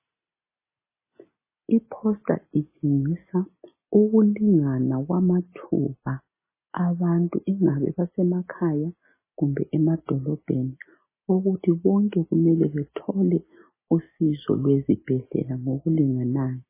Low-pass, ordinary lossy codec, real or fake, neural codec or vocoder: 3.6 kHz; MP3, 16 kbps; real; none